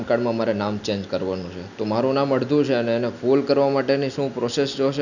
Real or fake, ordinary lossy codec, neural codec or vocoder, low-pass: real; none; none; 7.2 kHz